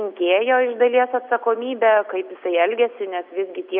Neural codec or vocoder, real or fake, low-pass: none; real; 5.4 kHz